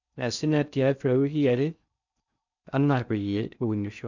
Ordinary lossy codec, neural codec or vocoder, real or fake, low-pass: none; codec, 16 kHz in and 24 kHz out, 0.6 kbps, FocalCodec, streaming, 4096 codes; fake; 7.2 kHz